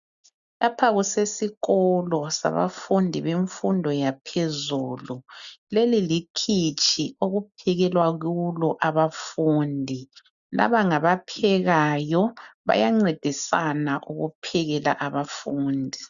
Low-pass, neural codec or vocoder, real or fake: 7.2 kHz; none; real